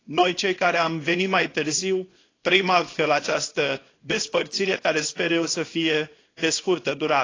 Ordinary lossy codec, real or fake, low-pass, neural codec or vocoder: AAC, 32 kbps; fake; 7.2 kHz; codec, 24 kHz, 0.9 kbps, WavTokenizer, small release